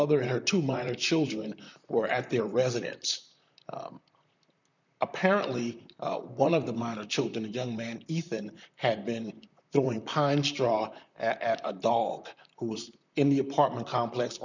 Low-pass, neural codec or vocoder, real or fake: 7.2 kHz; vocoder, 44.1 kHz, 128 mel bands, Pupu-Vocoder; fake